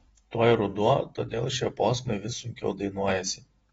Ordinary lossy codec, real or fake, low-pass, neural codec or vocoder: AAC, 24 kbps; fake; 19.8 kHz; vocoder, 48 kHz, 128 mel bands, Vocos